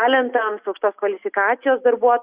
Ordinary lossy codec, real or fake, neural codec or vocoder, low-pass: Opus, 64 kbps; real; none; 3.6 kHz